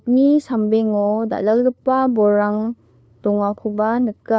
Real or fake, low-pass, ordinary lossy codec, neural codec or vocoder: fake; none; none; codec, 16 kHz, 2 kbps, FunCodec, trained on LibriTTS, 25 frames a second